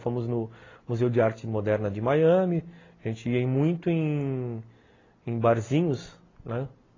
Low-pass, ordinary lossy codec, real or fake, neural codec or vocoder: 7.2 kHz; AAC, 32 kbps; real; none